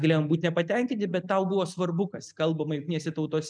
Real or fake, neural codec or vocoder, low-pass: real; none; 9.9 kHz